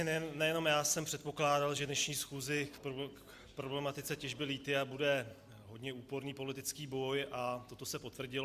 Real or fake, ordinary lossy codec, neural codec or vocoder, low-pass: real; Opus, 64 kbps; none; 14.4 kHz